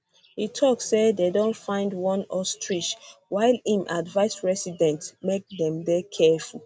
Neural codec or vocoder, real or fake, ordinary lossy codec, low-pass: none; real; none; none